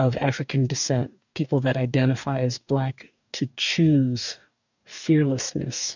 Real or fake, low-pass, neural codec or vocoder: fake; 7.2 kHz; codec, 44.1 kHz, 2.6 kbps, DAC